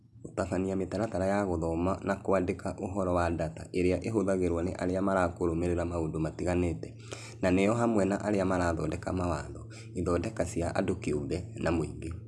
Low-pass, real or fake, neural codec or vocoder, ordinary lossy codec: none; real; none; none